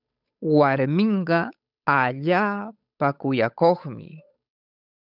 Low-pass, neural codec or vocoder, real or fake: 5.4 kHz; codec, 16 kHz, 8 kbps, FunCodec, trained on Chinese and English, 25 frames a second; fake